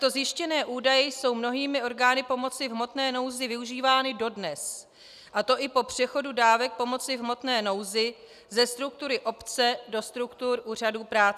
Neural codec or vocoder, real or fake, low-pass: none; real; 14.4 kHz